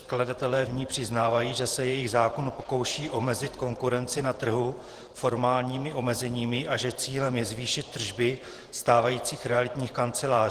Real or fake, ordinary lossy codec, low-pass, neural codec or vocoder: fake; Opus, 16 kbps; 14.4 kHz; vocoder, 48 kHz, 128 mel bands, Vocos